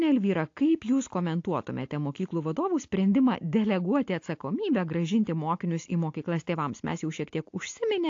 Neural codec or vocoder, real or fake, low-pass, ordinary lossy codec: none; real; 7.2 kHz; AAC, 48 kbps